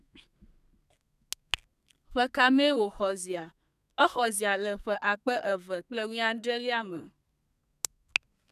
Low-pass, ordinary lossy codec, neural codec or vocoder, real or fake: 14.4 kHz; none; codec, 32 kHz, 1.9 kbps, SNAC; fake